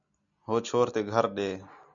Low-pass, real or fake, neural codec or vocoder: 7.2 kHz; real; none